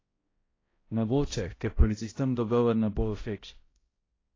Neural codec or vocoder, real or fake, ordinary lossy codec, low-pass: codec, 16 kHz, 0.5 kbps, X-Codec, HuBERT features, trained on balanced general audio; fake; AAC, 32 kbps; 7.2 kHz